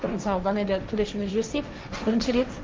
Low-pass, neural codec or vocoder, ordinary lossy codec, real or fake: 7.2 kHz; codec, 16 kHz, 1.1 kbps, Voila-Tokenizer; Opus, 32 kbps; fake